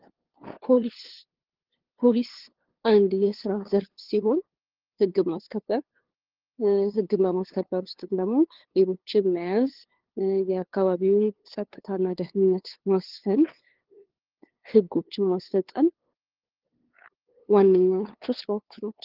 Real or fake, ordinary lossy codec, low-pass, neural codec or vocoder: fake; Opus, 16 kbps; 5.4 kHz; codec, 16 kHz, 2 kbps, FunCodec, trained on LibriTTS, 25 frames a second